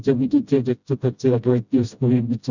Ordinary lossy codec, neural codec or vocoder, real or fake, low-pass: none; codec, 16 kHz, 0.5 kbps, FreqCodec, smaller model; fake; 7.2 kHz